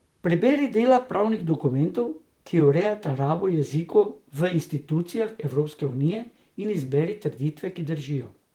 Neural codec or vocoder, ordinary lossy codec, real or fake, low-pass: vocoder, 44.1 kHz, 128 mel bands, Pupu-Vocoder; Opus, 24 kbps; fake; 19.8 kHz